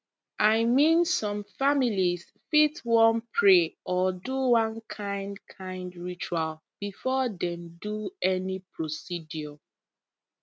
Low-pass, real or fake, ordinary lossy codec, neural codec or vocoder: none; real; none; none